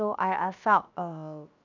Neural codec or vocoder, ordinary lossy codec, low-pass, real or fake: codec, 16 kHz, 0.7 kbps, FocalCodec; none; 7.2 kHz; fake